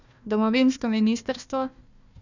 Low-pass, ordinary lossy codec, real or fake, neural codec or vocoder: 7.2 kHz; none; fake; codec, 16 kHz, 1 kbps, FunCodec, trained on Chinese and English, 50 frames a second